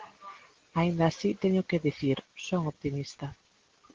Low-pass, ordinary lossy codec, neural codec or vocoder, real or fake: 7.2 kHz; Opus, 16 kbps; none; real